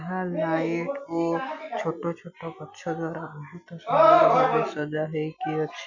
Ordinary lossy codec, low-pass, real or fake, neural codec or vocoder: none; 7.2 kHz; real; none